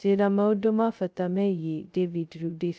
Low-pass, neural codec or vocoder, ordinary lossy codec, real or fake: none; codec, 16 kHz, 0.2 kbps, FocalCodec; none; fake